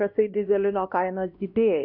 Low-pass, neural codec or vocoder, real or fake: 5.4 kHz; codec, 16 kHz, 1 kbps, X-Codec, WavLM features, trained on Multilingual LibriSpeech; fake